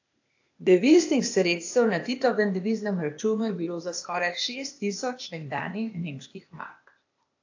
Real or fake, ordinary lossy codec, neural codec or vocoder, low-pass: fake; AAC, 48 kbps; codec, 16 kHz, 0.8 kbps, ZipCodec; 7.2 kHz